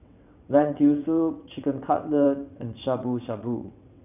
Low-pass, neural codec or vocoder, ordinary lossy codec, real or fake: 3.6 kHz; vocoder, 22.05 kHz, 80 mel bands, WaveNeXt; none; fake